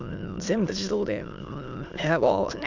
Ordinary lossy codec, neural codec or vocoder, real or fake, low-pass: none; autoencoder, 22.05 kHz, a latent of 192 numbers a frame, VITS, trained on many speakers; fake; 7.2 kHz